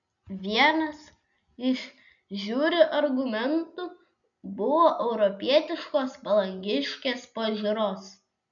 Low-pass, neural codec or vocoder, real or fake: 7.2 kHz; none; real